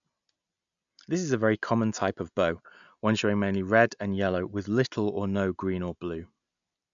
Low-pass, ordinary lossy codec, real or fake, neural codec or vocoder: 7.2 kHz; none; real; none